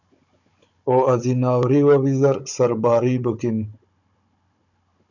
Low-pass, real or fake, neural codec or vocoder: 7.2 kHz; fake; codec, 16 kHz, 16 kbps, FunCodec, trained on Chinese and English, 50 frames a second